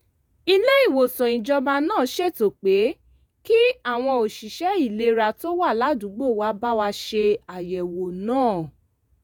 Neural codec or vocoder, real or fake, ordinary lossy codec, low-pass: vocoder, 48 kHz, 128 mel bands, Vocos; fake; none; none